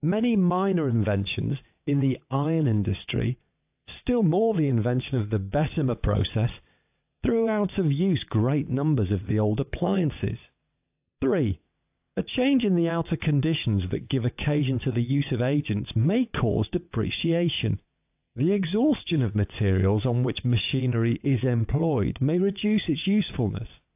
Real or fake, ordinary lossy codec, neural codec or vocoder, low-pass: fake; AAC, 32 kbps; vocoder, 22.05 kHz, 80 mel bands, WaveNeXt; 3.6 kHz